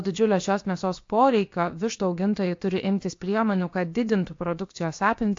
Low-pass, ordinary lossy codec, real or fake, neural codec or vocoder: 7.2 kHz; AAC, 48 kbps; fake; codec, 16 kHz, about 1 kbps, DyCAST, with the encoder's durations